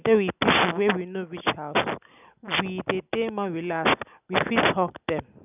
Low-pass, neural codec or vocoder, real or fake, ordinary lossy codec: 3.6 kHz; none; real; none